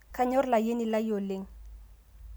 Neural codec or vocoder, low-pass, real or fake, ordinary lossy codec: none; none; real; none